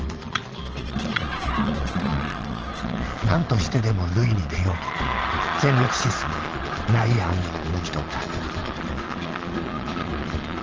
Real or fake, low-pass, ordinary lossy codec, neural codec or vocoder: fake; 7.2 kHz; Opus, 16 kbps; vocoder, 22.05 kHz, 80 mel bands, Vocos